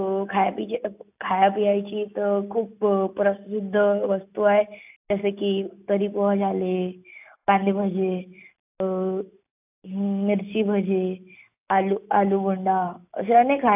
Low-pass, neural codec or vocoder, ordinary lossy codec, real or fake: 3.6 kHz; none; AAC, 32 kbps; real